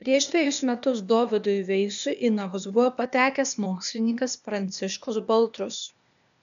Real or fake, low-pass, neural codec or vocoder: fake; 7.2 kHz; codec, 16 kHz, 0.8 kbps, ZipCodec